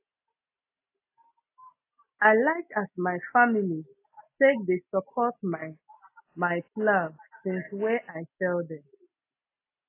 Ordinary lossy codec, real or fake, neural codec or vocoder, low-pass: AAC, 24 kbps; real; none; 3.6 kHz